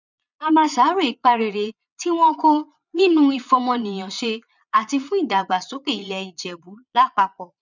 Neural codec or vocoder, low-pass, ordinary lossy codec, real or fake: vocoder, 22.05 kHz, 80 mel bands, Vocos; 7.2 kHz; none; fake